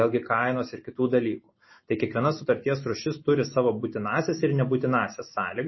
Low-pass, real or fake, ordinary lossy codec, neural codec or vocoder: 7.2 kHz; real; MP3, 24 kbps; none